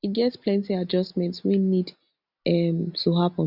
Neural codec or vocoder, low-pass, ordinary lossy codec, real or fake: none; 5.4 kHz; none; real